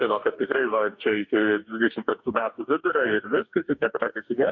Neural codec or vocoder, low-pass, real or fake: codec, 44.1 kHz, 2.6 kbps, DAC; 7.2 kHz; fake